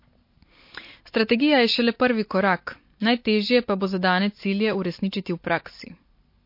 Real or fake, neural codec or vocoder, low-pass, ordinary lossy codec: real; none; 5.4 kHz; MP3, 32 kbps